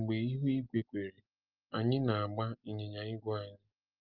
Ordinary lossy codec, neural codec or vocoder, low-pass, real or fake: Opus, 32 kbps; none; 5.4 kHz; real